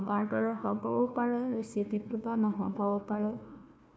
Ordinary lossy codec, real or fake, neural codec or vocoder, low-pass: none; fake; codec, 16 kHz, 1 kbps, FunCodec, trained on Chinese and English, 50 frames a second; none